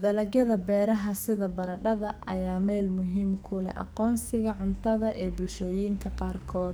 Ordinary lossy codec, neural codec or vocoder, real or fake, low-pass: none; codec, 44.1 kHz, 2.6 kbps, SNAC; fake; none